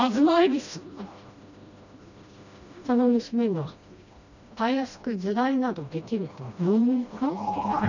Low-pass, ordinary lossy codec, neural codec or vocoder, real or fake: 7.2 kHz; none; codec, 16 kHz, 1 kbps, FreqCodec, smaller model; fake